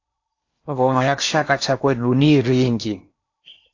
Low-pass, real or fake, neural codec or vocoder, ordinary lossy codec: 7.2 kHz; fake; codec, 16 kHz in and 24 kHz out, 0.8 kbps, FocalCodec, streaming, 65536 codes; AAC, 48 kbps